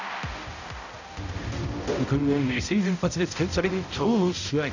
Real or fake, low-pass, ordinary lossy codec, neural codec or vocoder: fake; 7.2 kHz; none; codec, 16 kHz, 0.5 kbps, X-Codec, HuBERT features, trained on balanced general audio